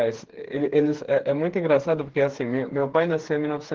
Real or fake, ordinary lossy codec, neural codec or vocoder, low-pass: fake; Opus, 16 kbps; codec, 16 kHz, 4 kbps, FreqCodec, smaller model; 7.2 kHz